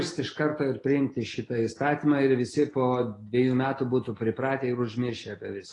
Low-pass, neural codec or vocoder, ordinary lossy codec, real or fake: 10.8 kHz; none; AAC, 32 kbps; real